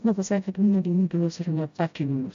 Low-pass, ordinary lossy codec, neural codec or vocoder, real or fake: 7.2 kHz; AAC, 64 kbps; codec, 16 kHz, 0.5 kbps, FreqCodec, smaller model; fake